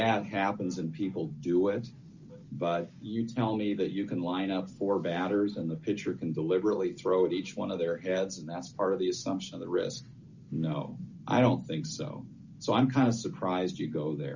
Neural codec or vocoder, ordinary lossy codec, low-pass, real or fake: none; Opus, 64 kbps; 7.2 kHz; real